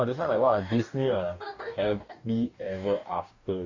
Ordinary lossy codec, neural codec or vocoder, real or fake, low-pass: Opus, 64 kbps; codec, 44.1 kHz, 2.6 kbps, DAC; fake; 7.2 kHz